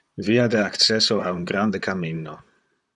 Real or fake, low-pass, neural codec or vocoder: fake; 10.8 kHz; vocoder, 44.1 kHz, 128 mel bands, Pupu-Vocoder